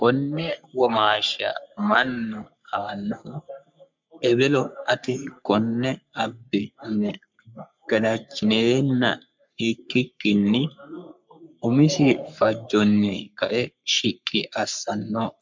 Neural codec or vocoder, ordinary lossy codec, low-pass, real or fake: codec, 44.1 kHz, 3.4 kbps, Pupu-Codec; MP3, 64 kbps; 7.2 kHz; fake